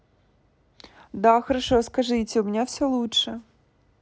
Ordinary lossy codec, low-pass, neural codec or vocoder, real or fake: none; none; none; real